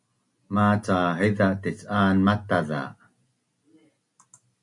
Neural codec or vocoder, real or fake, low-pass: none; real; 10.8 kHz